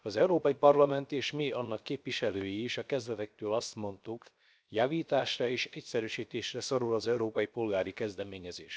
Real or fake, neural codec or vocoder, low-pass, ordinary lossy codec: fake; codec, 16 kHz, 0.7 kbps, FocalCodec; none; none